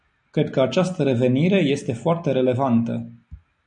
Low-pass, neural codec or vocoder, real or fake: 9.9 kHz; none; real